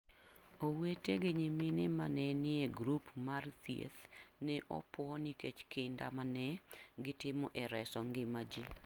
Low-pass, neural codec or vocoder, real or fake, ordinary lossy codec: 19.8 kHz; none; real; Opus, 32 kbps